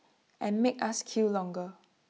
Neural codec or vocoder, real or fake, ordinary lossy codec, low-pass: none; real; none; none